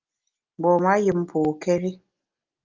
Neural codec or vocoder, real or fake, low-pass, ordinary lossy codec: none; real; 7.2 kHz; Opus, 32 kbps